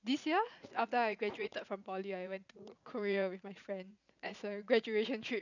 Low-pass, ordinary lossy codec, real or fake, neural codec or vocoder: 7.2 kHz; none; fake; vocoder, 44.1 kHz, 80 mel bands, Vocos